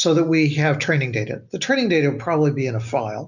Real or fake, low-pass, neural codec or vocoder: real; 7.2 kHz; none